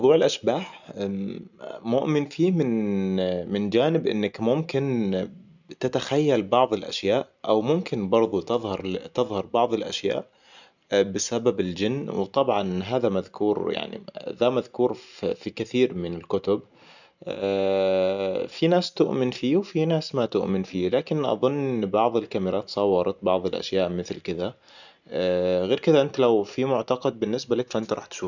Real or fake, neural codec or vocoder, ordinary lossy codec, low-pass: real; none; none; 7.2 kHz